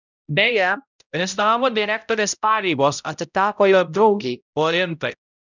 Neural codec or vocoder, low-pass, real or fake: codec, 16 kHz, 0.5 kbps, X-Codec, HuBERT features, trained on balanced general audio; 7.2 kHz; fake